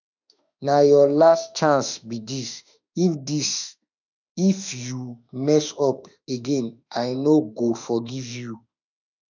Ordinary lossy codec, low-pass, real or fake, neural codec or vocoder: none; 7.2 kHz; fake; autoencoder, 48 kHz, 32 numbers a frame, DAC-VAE, trained on Japanese speech